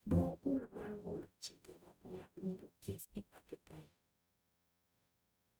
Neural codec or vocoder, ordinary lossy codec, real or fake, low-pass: codec, 44.1 kHz, 0.9 kbps, DAC; none; fake; none